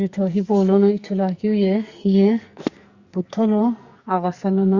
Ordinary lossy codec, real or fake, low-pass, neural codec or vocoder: Opus, 64 kbps; fake; 7.2 kHz; codec, 44.1 kHz, 2.6 kbps, SNAC